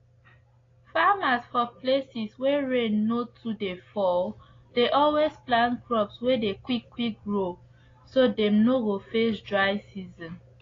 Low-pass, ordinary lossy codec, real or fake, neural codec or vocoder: 7.2 kHz; AAC, 32 kbps; real; none